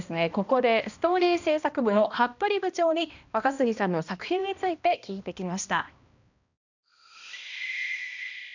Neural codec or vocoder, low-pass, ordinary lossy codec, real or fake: codec, 16 kHz, 1 kbps, X-Codec, HuBERT features, trained on balanced general audio; 7.2 kHz; none; fake